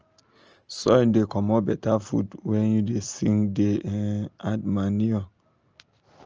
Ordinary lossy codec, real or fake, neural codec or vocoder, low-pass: Opus, 24 kbps; real; none; 7.2 kHz